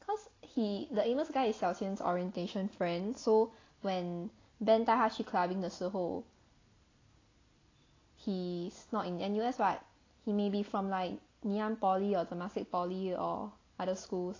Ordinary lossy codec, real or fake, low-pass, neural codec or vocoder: AAC, 32 kbps; real; 7.2 kHz; none